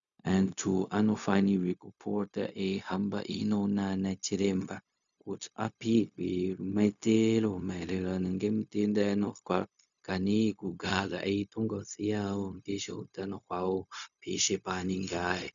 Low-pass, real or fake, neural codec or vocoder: 7.2 kHz; fake; codec, 16 kHz, 0.4 kbps, LongCat-Audio-Codec